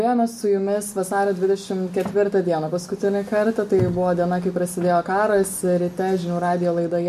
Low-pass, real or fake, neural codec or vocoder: 14.4 kHz; real; none